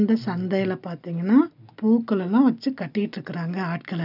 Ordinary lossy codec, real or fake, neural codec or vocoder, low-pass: none; real; none; 5.4 kHz